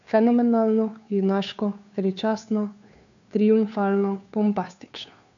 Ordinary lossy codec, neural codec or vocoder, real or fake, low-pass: none; codec, 16 kHz, 2 kbps, FunCodec, trained on Chinese and English, 25 frames a second; fake; 7.2 kHz